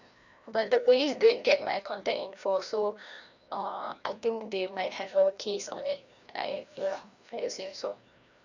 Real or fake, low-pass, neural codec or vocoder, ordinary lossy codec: fake; 7.2 kHz; codec, 16 kHz, 1 kbps, FreqCodec, larger model; none